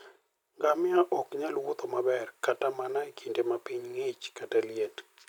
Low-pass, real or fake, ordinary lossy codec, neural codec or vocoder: 19.8 kHz; real; none; none